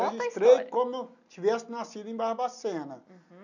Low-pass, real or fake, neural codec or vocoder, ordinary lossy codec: 7.2 kHz; real; none; none